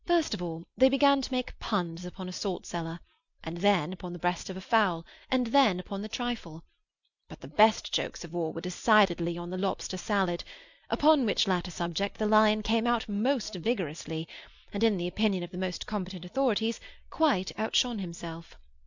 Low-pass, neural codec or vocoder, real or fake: 7.2 kHz; none; real